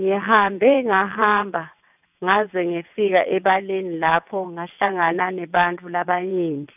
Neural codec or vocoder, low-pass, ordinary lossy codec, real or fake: vocoder, 22.05 kHz, 80 mel bands, WaveNeXt; 3.6 kHz; none; fake